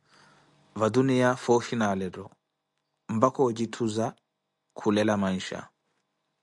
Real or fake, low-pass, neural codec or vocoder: real; 10.8 kHz; none